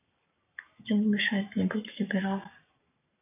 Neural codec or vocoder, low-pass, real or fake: codec, 44.1 kHz, 7.8 kbps, Pupu-Codec; 3.6 kHz; fake